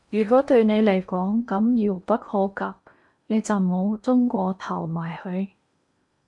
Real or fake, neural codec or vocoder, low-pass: fake; codec, 16 kHz in and 24 kHz out, 0.8 kbps, FocalCodec, streaming, 65536 codes; 10.8 kHz